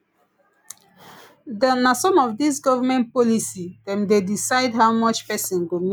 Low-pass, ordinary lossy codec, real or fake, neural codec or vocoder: none; none; real; none